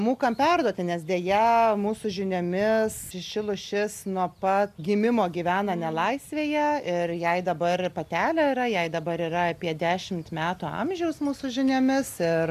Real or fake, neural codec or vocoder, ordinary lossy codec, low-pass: real; none; AAC, 96 kbps; 14.4 kHz